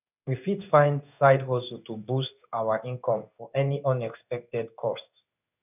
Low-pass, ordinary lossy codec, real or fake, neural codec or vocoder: 3.6 kHz; none; fake; codec, 16 kHz in and 24 kHz out, 1 kbps, XY-Tokenizer